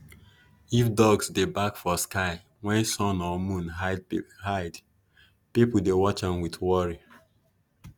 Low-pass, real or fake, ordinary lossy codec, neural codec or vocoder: none; real; none; none